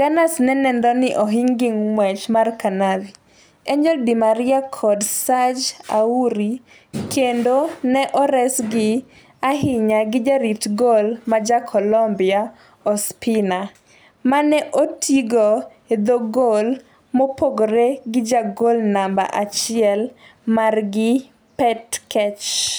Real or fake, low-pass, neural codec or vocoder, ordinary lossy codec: real; none; none; none